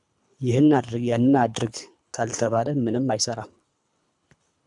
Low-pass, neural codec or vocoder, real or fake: 10.8 kHz; codec, 24 kHz, 3 kbps, HILCodec; fake